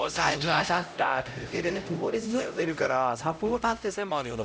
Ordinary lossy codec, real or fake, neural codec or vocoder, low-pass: none; fake; codec, 16 kHz, 0.5 kbps, X-Codec, HuBERT features, trained on LibriSpeech; none